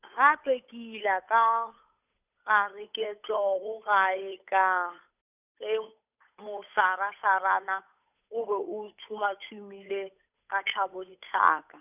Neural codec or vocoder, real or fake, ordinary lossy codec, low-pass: codec, 16 kHz, 8 kbps, FunCodec, trained on Chinese and English, 25 frames a second; fake; none; 3.6 kHz